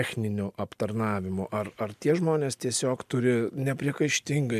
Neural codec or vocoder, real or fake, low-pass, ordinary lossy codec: none; real; 14.4 kHz; MP3, 96 kbps